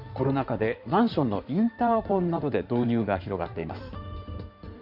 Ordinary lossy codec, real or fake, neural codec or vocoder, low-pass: none; fake; codec, 16 kHz in and 24 kHz out, 2.2 kbps, FireRedTTS-2 codec; 5.4 kHz